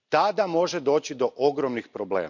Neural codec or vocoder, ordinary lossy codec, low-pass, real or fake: none; none; 7.2 kHz; real